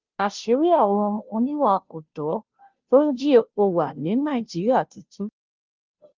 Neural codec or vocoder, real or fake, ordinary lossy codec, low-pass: codec, 16 kHz, 0.5 kbps, FunCodec, trained on Chinese and English, 25 frames a second; fake; Opus, 24 kbps; 7.2 kHz